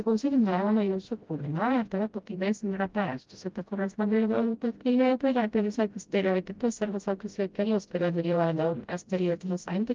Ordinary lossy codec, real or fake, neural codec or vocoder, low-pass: Opus, 32 kbps; fake; codec, 16 kHz, 0.5 kbps, FreqCodec, smaller model; 7.2 kHz